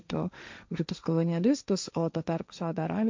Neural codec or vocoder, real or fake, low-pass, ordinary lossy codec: codec, 16 kHz, 1.1 kbps, Voila-Tokenizer; fake; 7.2 kHz; MP3, 64 kbps